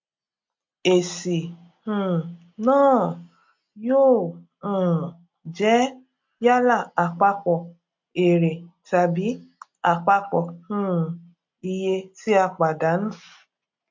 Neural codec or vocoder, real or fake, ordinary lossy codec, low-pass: none; real; MP3, 48 kbps; 7.2 kHz